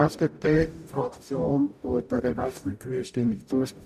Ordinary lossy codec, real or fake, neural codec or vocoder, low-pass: none; fake; codec, 44.1 kHz, 0.9 kbps, DAC; 14.4 kHz